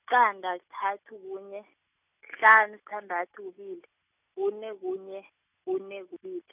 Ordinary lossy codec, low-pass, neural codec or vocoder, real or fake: none; 3.6 kHz; none; real